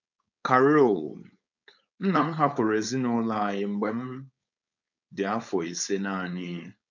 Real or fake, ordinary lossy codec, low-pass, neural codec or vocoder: fake; none; 7.2 kHz; codec, 16 kHz, 4.8 kbps, FACodec